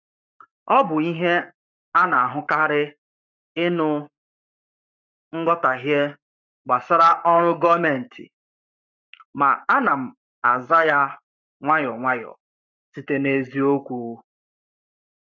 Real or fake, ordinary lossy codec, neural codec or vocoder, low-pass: fake; none; codec, 44.1 kHz, 7.8 kbps, Pupu-Codec; 7.2 kHz